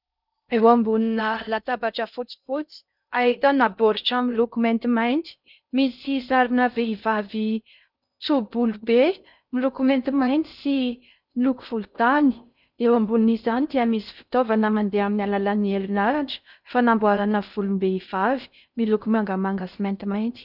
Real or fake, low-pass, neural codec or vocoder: fake; 5.4 kHz; codec, 16 kHz in and 24 kHz out, 0.6 kbps, FocalCodec, streaming, 4096 codes